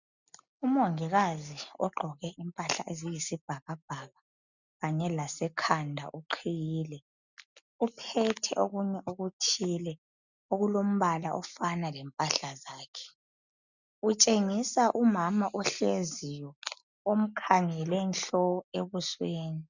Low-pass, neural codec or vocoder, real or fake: 7.2 kHz; none; real